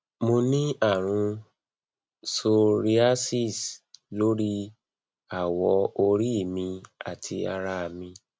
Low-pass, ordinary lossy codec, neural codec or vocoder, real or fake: none; none; none; real